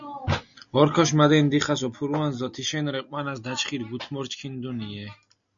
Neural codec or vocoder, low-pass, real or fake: none; 7.2 kHz; real